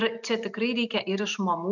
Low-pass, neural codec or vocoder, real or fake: 7.2 kHz; none; real